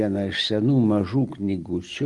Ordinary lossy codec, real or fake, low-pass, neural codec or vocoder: Opus, 24 kbps; real; 10.8 kHz; none